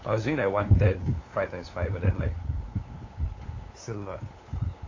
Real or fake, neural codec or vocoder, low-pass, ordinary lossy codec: fake; codec, 16 kHz, 8 kbps, FunCodec, trained on LibriTTS, 25 frames a second; 7.2 kHz; AAC, 32 kbps